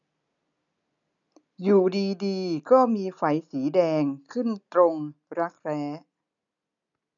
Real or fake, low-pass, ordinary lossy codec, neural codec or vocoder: real; 7.2 kHz; none; none